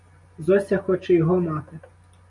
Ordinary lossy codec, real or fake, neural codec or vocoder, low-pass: MP3, 64 kbps; real; none; 10.8 kHz